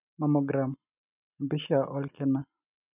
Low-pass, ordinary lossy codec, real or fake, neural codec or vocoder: 3.6 kHz; none; real; none